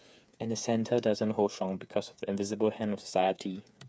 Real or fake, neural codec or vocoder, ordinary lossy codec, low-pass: fake; codec, 16 kHz, 8 kbps, FreqCodec, smaller model; none; none